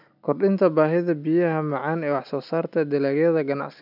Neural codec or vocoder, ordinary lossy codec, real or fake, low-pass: none; none; real; 5.4 kHz